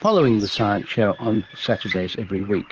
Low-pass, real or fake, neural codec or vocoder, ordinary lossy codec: 7.2 kHz; fake; vocoder, 44.1 kHz, 128 mel bands, Pupu-Vocoder; Opus, 16 kbps